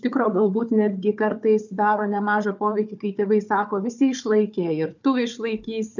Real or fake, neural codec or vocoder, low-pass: fake; codec, 16 kHz, 4 kbps, FunCodec, trained on Chinese and English, 50 frames a second; 7.2 kHz